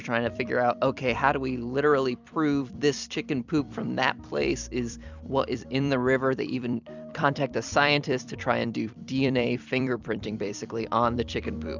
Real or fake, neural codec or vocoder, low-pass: fake; vocoder, 44.1 kHz, 128 mel bands every 256 samples, BigVGAN v2; 7.2 kHz